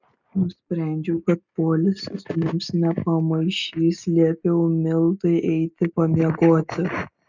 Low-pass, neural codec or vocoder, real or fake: 7.2 kHz; none; real